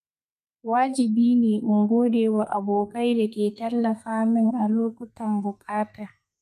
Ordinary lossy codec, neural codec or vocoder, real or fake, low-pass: none; codec, 32 kHz, 1.9 kbps, SNAC; fake; 14.4 kHz